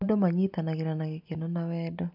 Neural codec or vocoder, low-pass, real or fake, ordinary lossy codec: none; 5.4 kHz; real; none